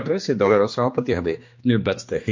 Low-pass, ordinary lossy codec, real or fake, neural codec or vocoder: 7.2 kHz; MP3, 48 kbps; fake; codec, 16 kHz, 2 kbps, X-Codec, HuBERT features, trained on general audio